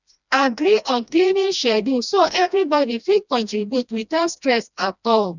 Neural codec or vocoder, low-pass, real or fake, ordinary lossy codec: codec, 16 kHz, 1 kbps, FreqCodec, smaller model; 7.2 kHz; fake; none